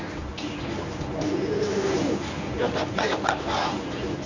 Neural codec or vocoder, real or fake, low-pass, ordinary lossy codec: codec, 24 kHz, 0.9 kbps, WavTokenizer, medium speech release version 1; fake; 7.2 kHz; none